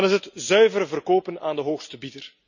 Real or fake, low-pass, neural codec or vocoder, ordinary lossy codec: real; 7.2 kHz; none; none